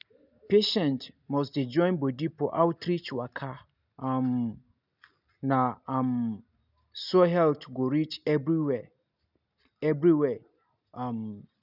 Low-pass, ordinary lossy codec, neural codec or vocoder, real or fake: 5.4 kHz; none; none; real